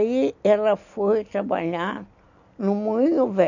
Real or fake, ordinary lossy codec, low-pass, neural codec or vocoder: real; none; 7.2 kHz; none